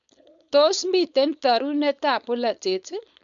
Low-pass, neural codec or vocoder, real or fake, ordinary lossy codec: 7.2 kHz; codec, 16 kHz, 4.8 kbps, FACodec; fake; none